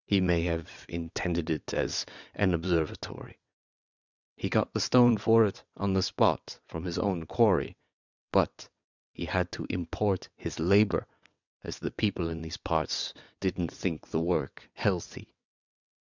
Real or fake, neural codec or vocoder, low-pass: fake; vocoder, 22.05 kHz, 80 mel bands, WaveNeXt; 7.2 kHz